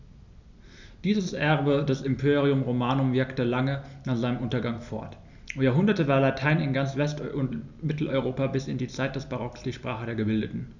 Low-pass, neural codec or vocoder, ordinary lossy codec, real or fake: 7.2 kHz; none; none; real